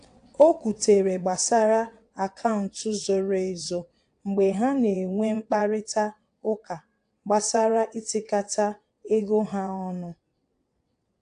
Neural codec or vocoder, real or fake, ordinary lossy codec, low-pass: vocoder, 22.05 kHz, 80 mel bands, WaveNeXt; fake; AAC, 64 kbps; 9.9 kHz